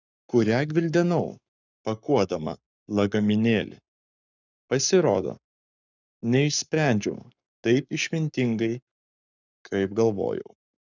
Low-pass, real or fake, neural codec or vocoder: 7.2 kHz; fake; codec, 44.1 kHz, 7.8 kbps, Pupu-Codec